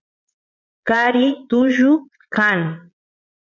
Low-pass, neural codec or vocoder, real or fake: 7.2 kHz; vocoder, 22.05 kHz, 80 mel bands, Vocos; fake